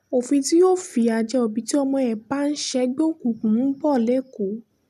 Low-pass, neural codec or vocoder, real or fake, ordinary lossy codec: 14.4 kHz; none; real; none